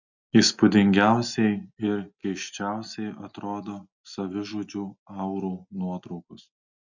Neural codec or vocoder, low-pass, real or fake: none; 7.2 kHz; real